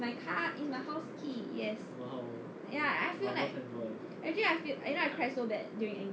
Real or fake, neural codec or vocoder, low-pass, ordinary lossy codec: real; none; none; none